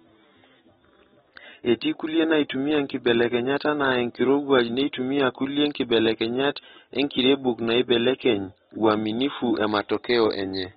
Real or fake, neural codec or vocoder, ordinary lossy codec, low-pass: real; none; AAC, 16 kbps; 7.2 kHz